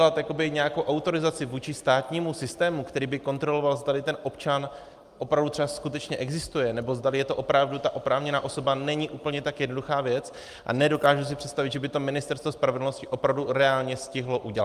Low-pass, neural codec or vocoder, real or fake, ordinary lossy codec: 14.4 kHz; none; real; Opus, 32 kbps